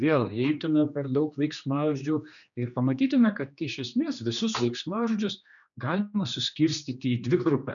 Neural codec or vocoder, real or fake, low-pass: codec, 16 kHz, 2 kbps, X-Codec, HuBERT features, trained on general audio; fake; 7.2 kHz